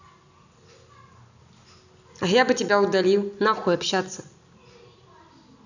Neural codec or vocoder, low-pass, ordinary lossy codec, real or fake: none; 7.2 kHz; none; real